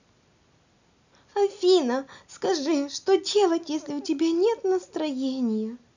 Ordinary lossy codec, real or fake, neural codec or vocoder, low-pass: none; real; none; 7.2 kHz